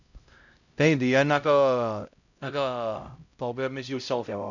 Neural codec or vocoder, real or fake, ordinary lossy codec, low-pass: codec, 16 kHz, 0.5 kbps, X-Codec, HuBERT features, trained on LibriSpeech; fake; none; 7.2 kHz